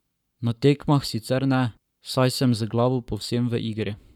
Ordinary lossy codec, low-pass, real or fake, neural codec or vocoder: none; 19.8 kHz; fake; codec, 44.1 kHz, 7.8 kbps, Pupu-Codec